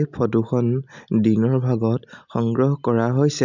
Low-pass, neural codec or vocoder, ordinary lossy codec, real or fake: 7.2 kHz; none; none; real